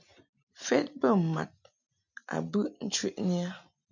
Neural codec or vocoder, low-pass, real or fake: none; 7.2 kHz; real